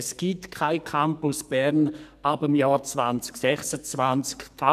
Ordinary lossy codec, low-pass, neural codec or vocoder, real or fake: none; 14.4 kHz; codec, 44.1 kHz, 2.6 kbps, SNAC; fake